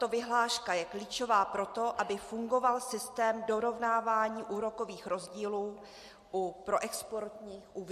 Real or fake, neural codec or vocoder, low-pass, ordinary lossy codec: real; none; 14.4 kHz; MP3, 64 kbps